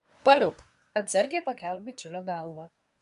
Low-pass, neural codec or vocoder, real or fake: 10.8 kHz; codec, 24 kHz, 1 kbps, SNAC; fake